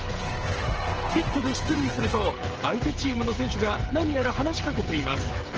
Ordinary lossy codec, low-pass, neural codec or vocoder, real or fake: Opus, 16 kbps; 7.2 kHz; codec, 16 kHz, 16 kbps, FreqCodec, smaller model; fake